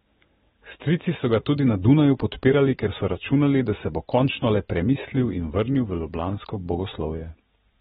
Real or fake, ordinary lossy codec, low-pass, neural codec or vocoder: real; AAC, 16 kbps; 19.8 kHz; none